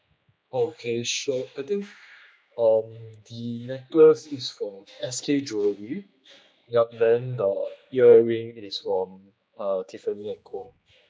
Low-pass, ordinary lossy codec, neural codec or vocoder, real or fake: none; none; codec, 16 kHz, 2 kbps, X-Codec, HuBERT features, trained on general audio; fake